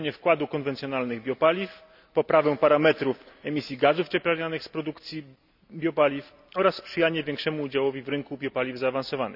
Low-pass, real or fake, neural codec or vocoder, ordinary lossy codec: 5.4 kHz; real; none; none